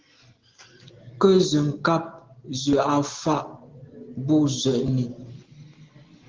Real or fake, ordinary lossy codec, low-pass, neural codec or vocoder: real; Opus, 16 kbps; 7.2 kHz; none